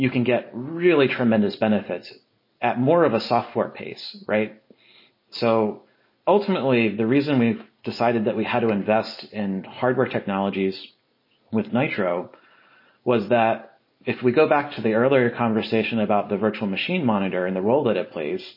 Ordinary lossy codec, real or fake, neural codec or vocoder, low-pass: MP3, 24 kbps; real; none; 5.4 kHz